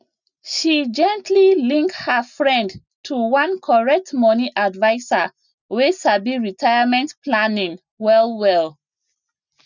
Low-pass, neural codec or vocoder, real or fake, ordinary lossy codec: 7.2 kHz; none; real; none